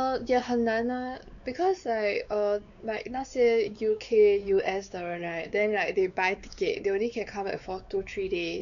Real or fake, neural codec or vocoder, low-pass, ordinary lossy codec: fake; codec, 16 kHz, 4 kbps, X-Codec, WavLM features, trained on Multilingual LibriSpeech; 7.2 kHz; none